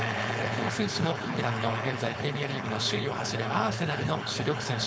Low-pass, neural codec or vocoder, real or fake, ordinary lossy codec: none; codec, 16 kHz, 4.8 kbps, FACodec; fake; none